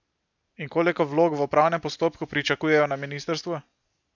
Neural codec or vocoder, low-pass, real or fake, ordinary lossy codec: none; 7.2 kHz; real; none